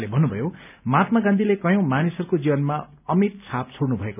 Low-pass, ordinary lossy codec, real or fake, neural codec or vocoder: 3.6 kHz; none; real; none